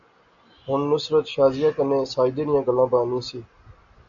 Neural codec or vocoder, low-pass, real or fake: none; 7.2 kHz; real